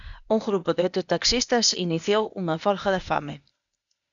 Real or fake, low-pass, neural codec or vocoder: fake; 7.2 kHz; codec, 16 kHz, 0.8 kbps, ZipCodec